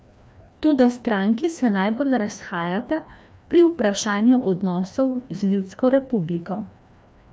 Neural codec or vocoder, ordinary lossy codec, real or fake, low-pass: codec, 16 kHz, 1 kbps, FreqCodec, larger model; none; fake; none